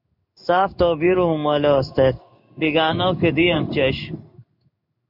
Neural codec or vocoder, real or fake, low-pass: codec, 16 kHz in and 24 kHz out, 1 kbps, XY-Tokenizer; fake; 5.4 kHz